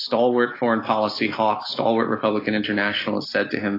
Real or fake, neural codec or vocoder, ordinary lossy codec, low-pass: real; none; AAC, 24 kbps; 5.4 kHz